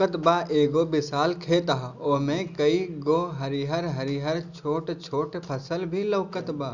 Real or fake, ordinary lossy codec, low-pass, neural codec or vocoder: real; none; 7.2 kHz; none